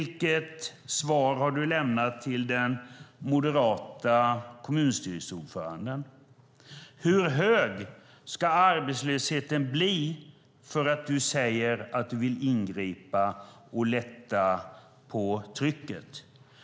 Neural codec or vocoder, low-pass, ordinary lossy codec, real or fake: none; none; none; real